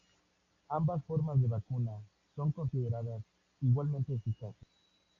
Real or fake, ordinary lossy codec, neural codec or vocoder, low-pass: real; AAC, 48 kbps; none; 7.2 kHz